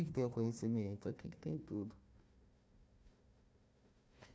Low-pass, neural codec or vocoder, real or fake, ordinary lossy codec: none; codec, 16 kHz, 1 kbps, FunCodec, trained on Chinese and English, 50 frames a second; fake; none